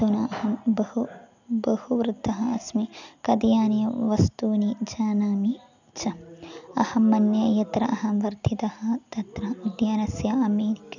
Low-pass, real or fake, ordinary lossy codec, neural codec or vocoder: 7.2 kHz; real; none; none